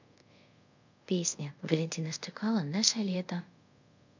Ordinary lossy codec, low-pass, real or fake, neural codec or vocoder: none; 7.2 kHz; fake; codec, 24 kHz, 0.5 kbps, DualCodec